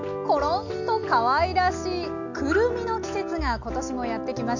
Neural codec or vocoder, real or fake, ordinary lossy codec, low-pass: none; real; none; 7.2 kHz